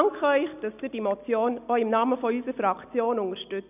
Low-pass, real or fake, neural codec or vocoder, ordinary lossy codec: 3.6 kHz; real; none; none